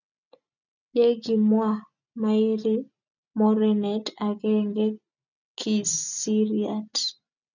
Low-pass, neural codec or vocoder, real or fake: 7.2 kHz; none; real